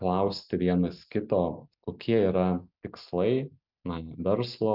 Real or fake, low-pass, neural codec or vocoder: real; 5.4 kHz; none